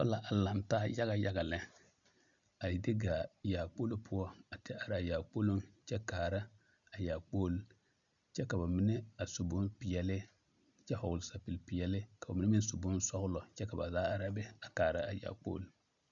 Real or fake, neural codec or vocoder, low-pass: real; none; 7.2 kHz